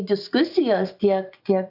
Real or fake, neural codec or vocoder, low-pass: fake; vocoder, 24 kHz, 100 mel bands, Vocos; 5.4 kHz